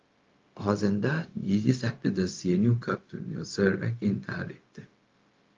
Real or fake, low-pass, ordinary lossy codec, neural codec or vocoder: fake; 7.2 kHz; Opus, 32 kbps; codec, 16 kHz, 0.4 kbps, LongCat-Audio-Codec